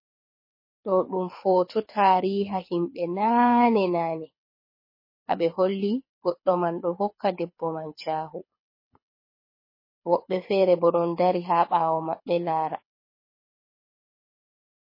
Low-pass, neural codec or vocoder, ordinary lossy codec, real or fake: 5.4 kHz; codec, 24 kHz, 6 kbps, HILCodec; MP3, 24 kbps; fake